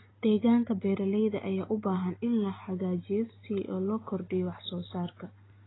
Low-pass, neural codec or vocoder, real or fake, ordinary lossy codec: 7.2 kHz; none; real; AAC, 16 kbps